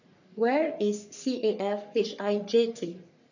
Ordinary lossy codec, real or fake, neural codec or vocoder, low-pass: none; fake; codec, 44.1 kHz, 3.4 kbps, Pupu-Codec; 7.2 kHz